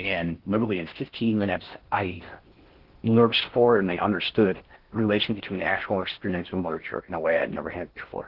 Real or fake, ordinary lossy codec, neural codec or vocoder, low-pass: fake; Opus, 16 kbps; codec, 16 kHz in and 24 kHz out, 0.6 kbps, FocalCodec, streaming, 4096 codes; 5.4 kHz